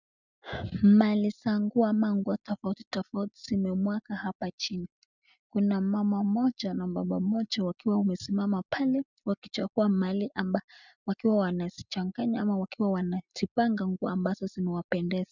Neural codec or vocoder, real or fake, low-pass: none; real; 7.2 kHz